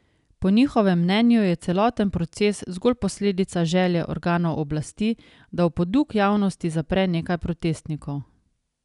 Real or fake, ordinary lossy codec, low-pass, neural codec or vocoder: real; none; 10.8 kHz; none